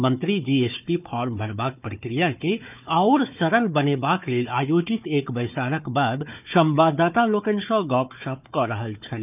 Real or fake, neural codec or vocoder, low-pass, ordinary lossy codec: fake; codec, 16 kHz, 4 kbps, FunCodec, trained on Chinese and English, 50 frames a second; 3.6 kHz; none